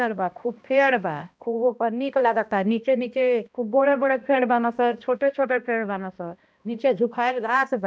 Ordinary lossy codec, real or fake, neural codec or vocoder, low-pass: none; fake; codec, 16 kHz, 1 kbps, X-Codec, HuBERT features, trained on balanced general audio; none